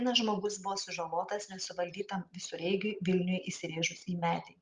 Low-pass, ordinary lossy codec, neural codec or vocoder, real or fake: 7.2 kHz; Opus, 32 kbps; none; real